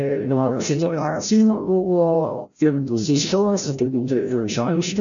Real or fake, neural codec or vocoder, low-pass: fake; codec, 16 kHz, 0.5 kbps, FreqCodec, larger model; 7.2 kHz